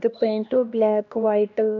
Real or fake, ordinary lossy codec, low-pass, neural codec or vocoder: fake; none; 7.2 kHz; codec, 16 kHz, 1 kbps, X-Codec, HuBERT features, trained on LibriSpeech